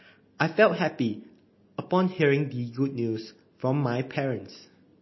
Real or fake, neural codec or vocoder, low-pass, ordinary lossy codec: real; none; 7.2 kHz; MP3, 24 kbps